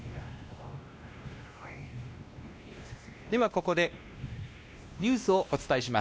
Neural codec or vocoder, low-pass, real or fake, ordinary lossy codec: codec, 16 kHz, 1 kbps, X-Codec, WavLM features, trained on Multilingual LibriSpeech; none; fake; none